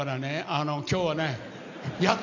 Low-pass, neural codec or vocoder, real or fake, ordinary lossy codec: 7.2 kHz; none; real; none